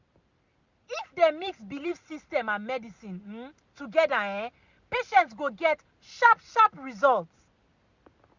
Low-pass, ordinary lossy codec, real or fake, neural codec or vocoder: 7.2 kHz; Opus, 64 kbps; real; none